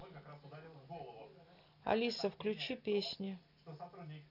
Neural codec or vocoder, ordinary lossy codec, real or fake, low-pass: none; none; real; 5.4 kHz